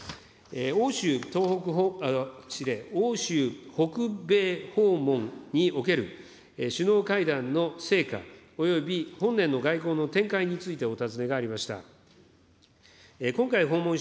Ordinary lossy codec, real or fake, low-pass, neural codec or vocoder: none; real; none; none